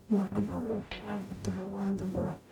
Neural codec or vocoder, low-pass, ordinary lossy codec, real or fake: codec, 44.1 kHz, 0.9 kbps, DAC; 19.8 kHz; none; fake